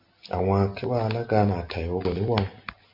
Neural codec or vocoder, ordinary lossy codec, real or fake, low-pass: none; MP3, 48 kbps; real; 5.4 kHz